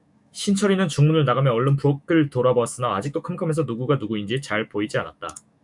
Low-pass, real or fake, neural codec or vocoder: 10.8 kHz; fake; autoencoder, 48 kHz, 128 numbers a frame, DAC-VAE, trained on Japanese speech